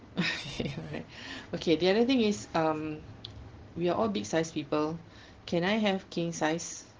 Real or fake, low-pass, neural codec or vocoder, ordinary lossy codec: real; 7.2 kHz; none; Opus, 16 kbps